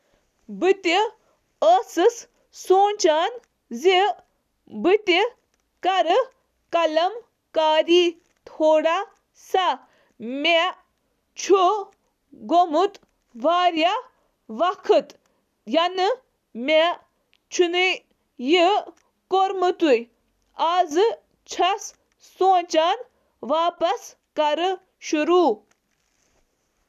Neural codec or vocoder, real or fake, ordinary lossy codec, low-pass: none; real; none; 14.4 kHz